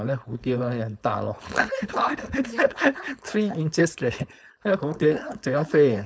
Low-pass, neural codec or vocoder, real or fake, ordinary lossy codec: none; codec, 16 kHz, 4.8 kbps, FACodec; fake; none